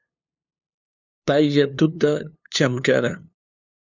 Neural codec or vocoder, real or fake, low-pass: codec, 16 kHz, 2 kbps, FunCodec, trained on LibriTTS, 25 frames a second; fake; 7.2 kHz